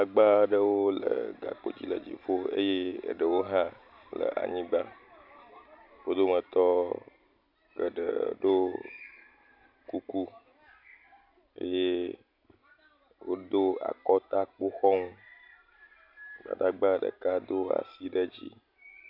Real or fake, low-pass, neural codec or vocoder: real; 5.4 kHz; none